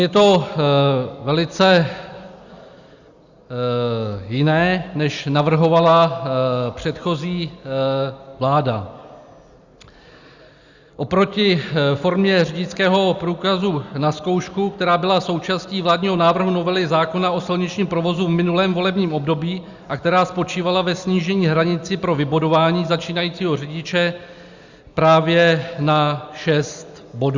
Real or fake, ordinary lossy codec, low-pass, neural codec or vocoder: real; Opus, 64 kbps; 7.2 kHz; none